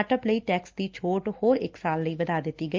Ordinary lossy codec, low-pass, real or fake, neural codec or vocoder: Opus, 32 kbps; 7.2 kHz; real; none